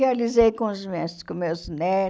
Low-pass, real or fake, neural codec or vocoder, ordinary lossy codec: none; real; none; none